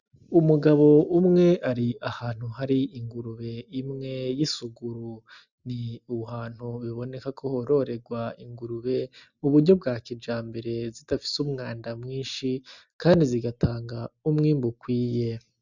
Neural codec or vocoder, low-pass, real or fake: none; 7.2 kHz; real